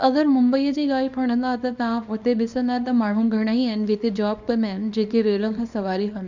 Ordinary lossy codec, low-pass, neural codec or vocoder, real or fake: none; 7.2 kHz; codec, 24 kHz, 0.9 kbps, WavTokenizer, small release; fake